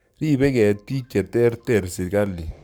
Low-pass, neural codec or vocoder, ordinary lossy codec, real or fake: none; codec, 44.1 kHz, 7.8 kbps, Pupu-Codec; none; fake